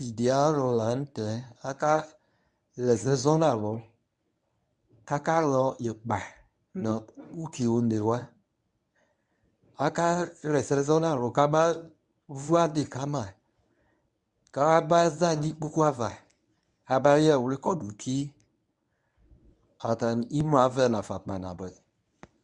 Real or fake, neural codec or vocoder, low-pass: fake; codec, 24 kHz, 0.9 kbps, WavTokenizer, medium speech release version 1; 10.8 kHz